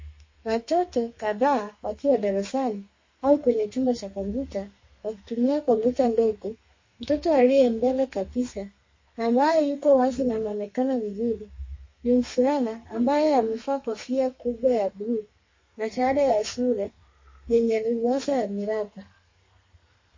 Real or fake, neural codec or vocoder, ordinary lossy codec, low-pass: fake; codec, 32 kHz, 1.9 kbps, SNAC; MP3, 32 kbps; 7.2 kHz